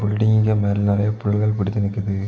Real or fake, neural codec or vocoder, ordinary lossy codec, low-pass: real; none; none; none